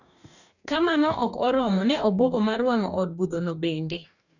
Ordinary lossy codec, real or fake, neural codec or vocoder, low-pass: none; fake; codec, 44.1 kHz, 2.6 kbps, DAC; 7.2 kHz